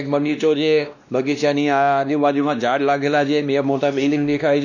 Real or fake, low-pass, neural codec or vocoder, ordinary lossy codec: fake; 7.2 kHz; codec, 16 kHz, 1 kbps, X-Codec, WavLM features, trained on Multilingual LibriSpeech; none